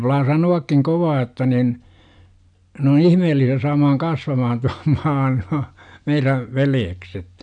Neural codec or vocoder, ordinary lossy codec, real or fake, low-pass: none; none; real; 9.9 kHz